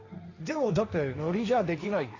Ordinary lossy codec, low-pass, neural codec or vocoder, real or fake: AAC, 32 kbps; 7.2 kHz; codec, 16 kHz, 1.1 kbps, Voila-Tokenizer; fake